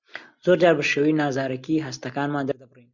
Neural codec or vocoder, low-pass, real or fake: none; 7.2 kHz; real